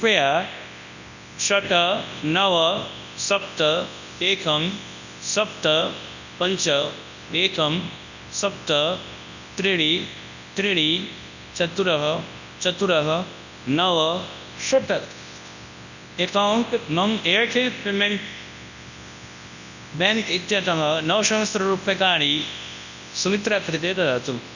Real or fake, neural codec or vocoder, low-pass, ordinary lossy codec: fake; codec, 24 kHz, 0.9 kbps, WavTokenizer, large speech release; 7.2 kHz; none